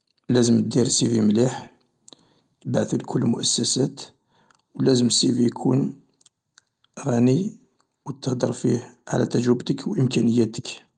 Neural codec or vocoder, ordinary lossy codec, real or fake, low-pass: none; Opus, 32 kbps; real; 10.8 kHz